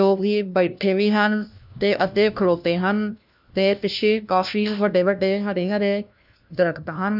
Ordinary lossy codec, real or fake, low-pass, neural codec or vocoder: none; fake; 5.4 kHz; codec, 16 kHz, 1 kbps, FunCodec, trained on LibriTTS, 50 frames a second